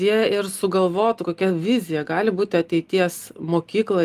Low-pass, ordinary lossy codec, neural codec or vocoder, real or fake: 14.4 kHz; Opus, 32 kbps; none; real